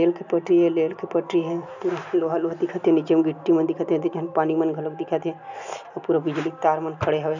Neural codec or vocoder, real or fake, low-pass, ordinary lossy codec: none; real; 7.2 kHz; none